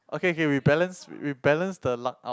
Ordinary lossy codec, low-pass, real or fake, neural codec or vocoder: none; none; real; none